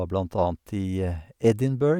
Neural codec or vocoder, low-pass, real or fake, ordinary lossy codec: none; 19.8 kHz; real; none